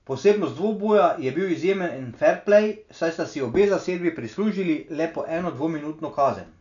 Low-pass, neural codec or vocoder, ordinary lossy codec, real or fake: 7.2 kHz; none; none; real